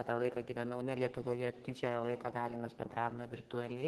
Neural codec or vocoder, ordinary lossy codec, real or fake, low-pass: codec, 32 kHz, 1.9 kbps, SNAC; Opus, 16 kbps; fake; 14.4 kHz